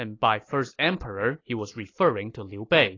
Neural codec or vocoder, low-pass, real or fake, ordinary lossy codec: none; 7.2 kHz; real; AAC, 32 kbps